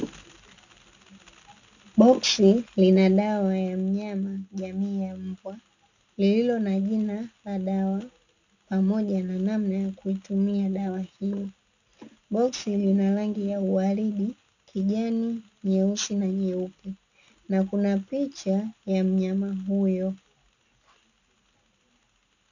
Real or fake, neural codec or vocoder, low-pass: real; none; 7.2 kHz